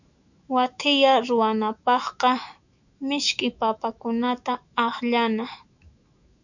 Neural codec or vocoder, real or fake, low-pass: autoencoder, 48 kHz, 128 numbers a frame, DAC-VAE, trained on Japanese speech; fake; 7.2 kHz